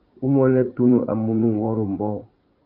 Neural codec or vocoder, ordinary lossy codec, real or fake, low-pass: vocoder, 44.1 kHz, 80 mel bands, Vocos; Opus, 24 kbps; fake; 5.4 kHz